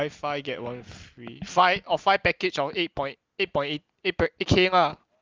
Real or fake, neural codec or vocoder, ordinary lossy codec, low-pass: real; none; Opus, 24 kbps; 7.2 kHz